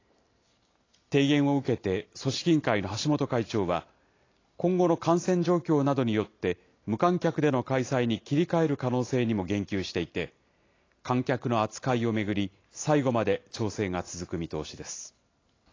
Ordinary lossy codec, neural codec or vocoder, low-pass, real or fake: AAC, 32 kbps; none; 7.2 kHz; real